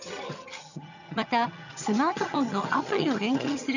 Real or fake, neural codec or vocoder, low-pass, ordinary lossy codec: fake; vocoder, 22.05 kHz, 80 mel bands, HiFi-GAN; 7.2 kHz; none